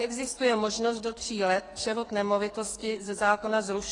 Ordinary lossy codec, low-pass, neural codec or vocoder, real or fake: AAC, 32 kbps; 10.8 kHz; codec, 44.1 kHz, 2.6 kbps, SNAC; fake